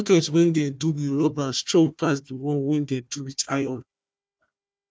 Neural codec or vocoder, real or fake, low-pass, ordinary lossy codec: codec, 16 kHz, 1 kbps, FunCodec, trained on Chinese and English, 50 frames a second; fake; none; none